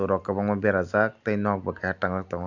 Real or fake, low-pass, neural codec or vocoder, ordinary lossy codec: real; 7.2 kHz; none; none